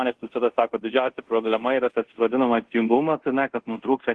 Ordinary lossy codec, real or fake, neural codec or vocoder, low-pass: Opus, 16 kbps; fake; codec, 24 kHz, 0.5 kbps, DualCodec; 10.8 kHz